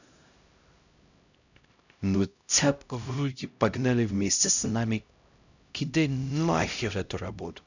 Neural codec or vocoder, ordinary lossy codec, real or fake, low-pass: codec, 16 kHz, 0.5 kbps, X-Codec, HuBERT features, trained on LibriSpeech; none; fake; 7.2 kHz